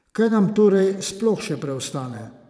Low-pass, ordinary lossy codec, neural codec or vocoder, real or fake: none; none; vocoder, 22.05 kHz, 80 mel bands, Vocos; fake